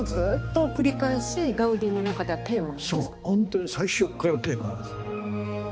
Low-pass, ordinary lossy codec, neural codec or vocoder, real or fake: none; none; codec, 16 kHz, 2 kbps, X-Codec, HuBERT features, trained on balanced general audio; fake